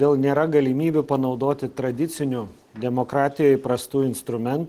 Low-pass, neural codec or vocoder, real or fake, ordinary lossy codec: 14.4 kHz; none; real; Opus, 16 kbps